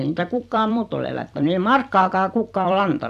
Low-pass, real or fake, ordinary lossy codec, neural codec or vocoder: 14.4 kHz; fake; none; vocoder, 44.1 kHz, 128 mel bands every 256 samples, BigVGAN v2